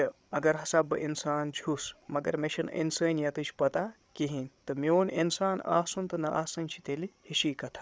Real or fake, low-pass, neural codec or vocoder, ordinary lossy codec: fake; none; codec, 16 kHz, 8 kbps, FreqCodec, larger model; none